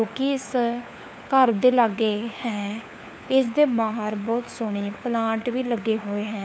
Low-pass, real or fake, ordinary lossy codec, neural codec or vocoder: none; fake; none; codec, 16 kHz, 4 kbps, FunCodec, trained on LibriTTS, 50 frames a second